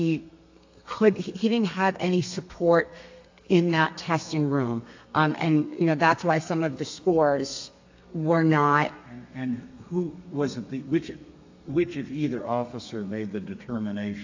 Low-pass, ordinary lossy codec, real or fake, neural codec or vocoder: 7.2 kHz; AAC, 48 kbps; fake; codec, 32 kHz, 1.9 kbps, SNAC